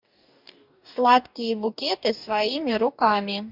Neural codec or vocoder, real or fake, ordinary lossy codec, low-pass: codec, 44.1 kHz, 2.6 kbps, DAC; fake; MP3, 48 kbps; 5.4 kHz